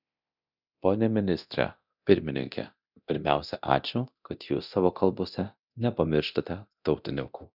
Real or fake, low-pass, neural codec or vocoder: fake; 5.4 kHz; codec, 24 kHz, 0.9 kbps, DualCodec